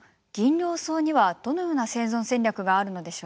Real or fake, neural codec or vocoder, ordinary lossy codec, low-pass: real; none; none; none